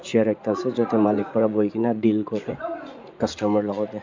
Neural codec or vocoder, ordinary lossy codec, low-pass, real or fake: vocoder, 44.1 kHz, 80 mel bands, Vocos; MP3, 64 kbps; 7.2 kHz; fake